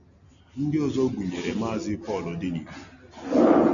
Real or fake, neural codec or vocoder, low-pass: real; none; 7.2 kHz